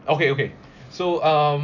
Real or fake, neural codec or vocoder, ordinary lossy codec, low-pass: real; none; none; 7.2 kHz